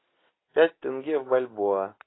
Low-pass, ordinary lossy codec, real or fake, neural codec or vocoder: 7.2 kHz; AAC, 16 kbps; real; none